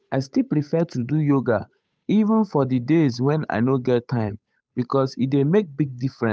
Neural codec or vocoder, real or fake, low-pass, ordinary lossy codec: codec, 16 kHz, 8 kbps, FunCodec, trained on Chinese and English, 25 frames a second; fake; none; none